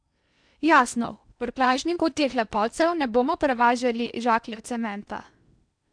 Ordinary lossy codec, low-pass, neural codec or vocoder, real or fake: none; 9.9 kHz; codec, 16 kHz in and 24 kHz out, 0.8 kbps, FocalCodec, streaming, 65536 codes; fake